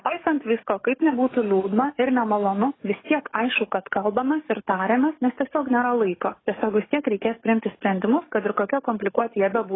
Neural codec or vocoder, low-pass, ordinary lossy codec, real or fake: codec, 44.1 kHz, 7.8 kbps, DAC; 7.2 kHz; AAC, 16 kbps; fake